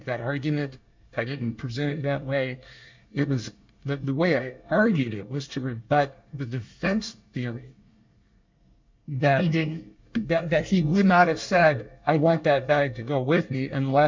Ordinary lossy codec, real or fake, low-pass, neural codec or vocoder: MP3, 64 kbps; fake; 7.2 kHz; codec, 24 kHz, 1 kbps, SNAC